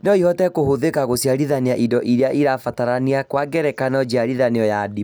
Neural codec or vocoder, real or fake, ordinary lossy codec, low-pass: none; real; none; none